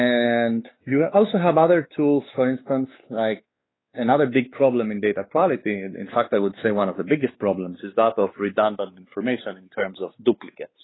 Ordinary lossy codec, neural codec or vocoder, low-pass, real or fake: AAC, 16 kbps; codec, 16 kHz, 4 kbps, X-Codec, WavLM features, trained on Multilingual LibriSpeech; 7.2 kHz; fake